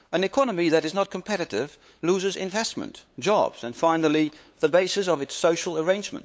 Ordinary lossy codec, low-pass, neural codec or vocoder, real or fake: none; none; codec, 16 kHz, 8 kbps, FunCodec, trained on LibriTTS, 25 frames a second; fake